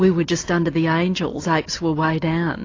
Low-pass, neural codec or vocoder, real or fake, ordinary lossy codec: 7.2 kHz; none; real; AAC, 32 kbps